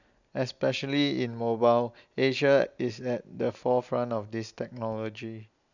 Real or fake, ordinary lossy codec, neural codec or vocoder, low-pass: real; none; none; 7.2 kHz